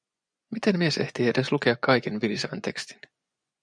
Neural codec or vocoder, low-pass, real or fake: vocoder, 24 kHz, 100 mel bands, Vocos; 9.9 kHz; fake